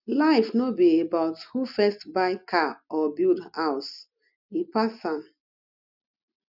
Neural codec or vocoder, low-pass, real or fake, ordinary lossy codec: none; 5.4 kHz; real; none